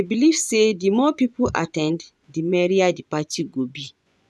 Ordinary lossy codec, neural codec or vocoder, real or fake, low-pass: none; vocoder, 24 kHz, 100 mel bands, Vocos; fake; none